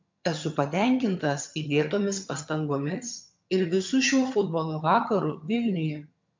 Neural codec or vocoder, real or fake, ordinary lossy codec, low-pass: vocoder, 22.05 kHz, 80 mel bands, HiFi-GAN; fake; MP3, 64 kbps; 7.2 kHz